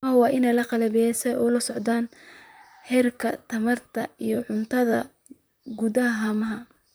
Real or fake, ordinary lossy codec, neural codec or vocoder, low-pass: fake; none; vocoder, 44.1 kHz, 128 mel bands, Pupu-Vocoder; none